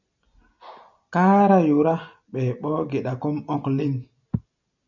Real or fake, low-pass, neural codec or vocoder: real; 7.2 kHz; none